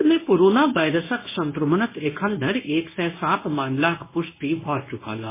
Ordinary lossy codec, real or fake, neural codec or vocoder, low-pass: MP3, 16 kbps; fake; codec, 24 kHz, 0.9 kbps, WavTokenizer, medium speech release version 1; 3.6 kHz